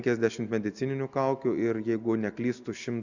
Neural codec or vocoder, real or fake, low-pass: none; real; 7.2 kHz